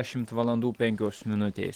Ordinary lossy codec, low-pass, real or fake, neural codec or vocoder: Opus, 16 kbps; 19.8 kHz; fake; vocoder, 44.1 kHz, 128 mel bands every 512 samples, BigVGAN v2